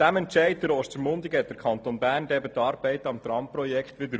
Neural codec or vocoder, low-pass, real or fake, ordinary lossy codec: none; none; real; none